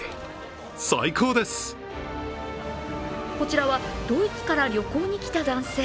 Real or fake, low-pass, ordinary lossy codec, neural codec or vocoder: real; none; none; none